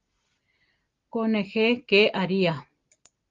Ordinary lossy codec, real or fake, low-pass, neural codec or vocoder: Opus, 32 kbps; real; 7.2 kHz; none